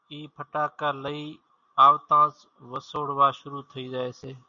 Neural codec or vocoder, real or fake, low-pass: none; real; 7.2 kHz